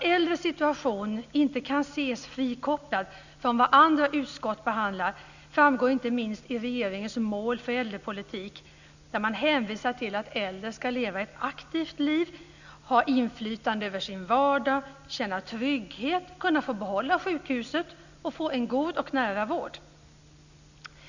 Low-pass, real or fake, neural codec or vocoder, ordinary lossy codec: 7.2 kHz; real; none; none